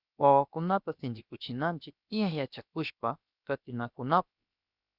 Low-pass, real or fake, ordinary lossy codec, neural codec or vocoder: 5.4 kHz; fake; Opus, 64 kbps; codec, 16 kHz, about 1 kbps, DyCAST, with the encoder's durations